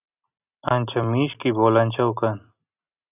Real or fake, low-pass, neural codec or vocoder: real; 3.6 kHz; none